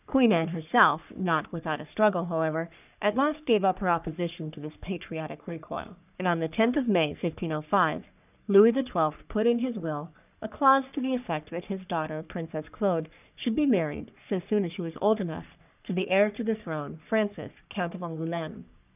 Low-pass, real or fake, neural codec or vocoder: 3.6 kHz; fake; codec, 44.1 kHz, 3.4 kbps, Pupu-Codec